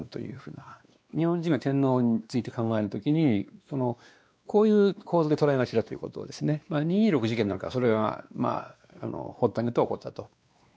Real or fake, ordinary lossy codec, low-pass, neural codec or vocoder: fake; none; none; codec, 16 kHz, 2 kbps, X-Codec, WavLM features, trained on Multilingual LibriSpeech